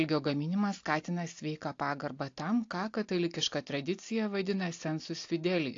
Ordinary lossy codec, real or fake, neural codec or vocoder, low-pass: AAC, 48 kbps; real; none; 7.2 kHz